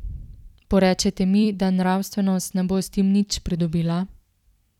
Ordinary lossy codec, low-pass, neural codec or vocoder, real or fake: none; 19.8 kHz; vocoder, 44.1 kHz, 128 mel bands every 256 samples, BigVGAN v2; fake